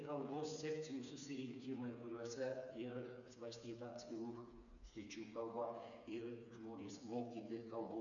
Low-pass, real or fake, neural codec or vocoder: 7.2 kHz; fake; codec, 16 kHz, 4 kbps, FreqCodec, smaller model